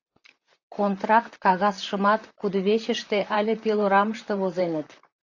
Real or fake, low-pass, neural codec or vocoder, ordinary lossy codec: fake; 7.2 kHz; vocoder, 44.1 kHz, 128 mel bands, Pupu-Vocoder; AAC, 48 kbps